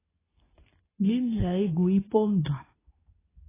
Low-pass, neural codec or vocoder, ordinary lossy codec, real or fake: 3.6 kHz; codec, 24 kHz, 0.9 kbps, WavTokenizer, medium speech release version 2; AAC, 16 kbps; fake